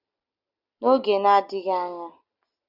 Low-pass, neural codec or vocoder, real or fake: 5.4 kHz; none; real